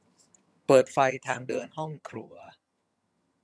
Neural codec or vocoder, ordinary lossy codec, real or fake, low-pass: vocoder, 22.05 kHz, 80 mel bands, HiFi-GAN; none; fake; none